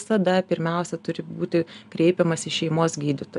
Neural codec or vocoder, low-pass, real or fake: none; 10.8 kHz; real